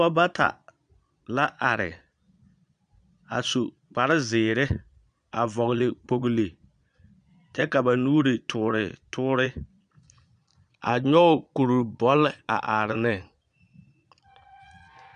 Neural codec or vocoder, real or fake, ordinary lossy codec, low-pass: vocoder, 22.05 kHz, 80 mel bands, Vocos; fake; MP3, 96 kbps; 9.9 kHz